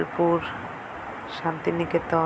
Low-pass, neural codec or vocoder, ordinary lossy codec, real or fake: none; none; none; real